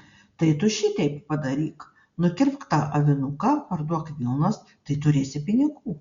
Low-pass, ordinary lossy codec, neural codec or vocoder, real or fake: 7.2 kHz; Opus, 64 kbps; none; real